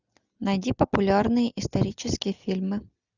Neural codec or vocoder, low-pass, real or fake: none; 7.2 kHz; real